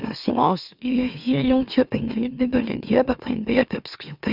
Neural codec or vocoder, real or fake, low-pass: autoencoder, 44.1 kHz, a latent of 192 numbers a frame, MeloTTS; fake; 5.4 kHz